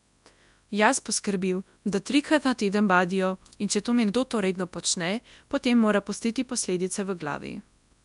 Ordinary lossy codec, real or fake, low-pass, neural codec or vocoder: none; fake; 10.8 kHz; codec, 24 kHz, 0.9 kbps, WavTokenizer, large speech release